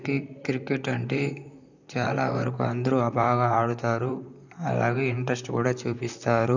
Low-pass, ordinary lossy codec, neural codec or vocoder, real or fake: 7.2 kHz; none; vocoder, 44.1 kHz, 128 mel bands, Pupu-Vocoder; fake